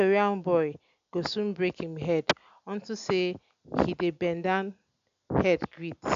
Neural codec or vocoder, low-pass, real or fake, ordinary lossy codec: none; 7.2 kHz; real; AAC, 64 kbps